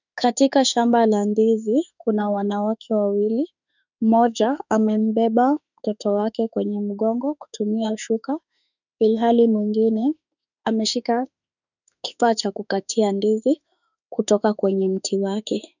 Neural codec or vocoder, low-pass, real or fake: autoencoder, 48 kHz, 32 numbers a frame, DAC-VAE, trained on Japanese speech; 7.2 kHz; fake